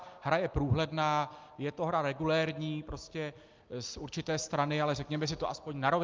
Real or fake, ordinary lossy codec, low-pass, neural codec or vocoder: real; Opus, 32 kbps; 7.2 kHz; none